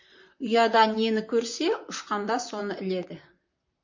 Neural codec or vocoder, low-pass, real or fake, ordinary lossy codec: vocoder, 44.1 kHz, 128 mel bands, Pupu-Vocoder; 7.2 kHz; fake; MP3, 48 kbps